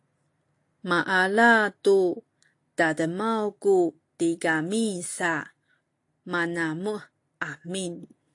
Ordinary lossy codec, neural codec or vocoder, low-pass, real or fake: AAC, 48 kbps; none; 10.8 kHz; real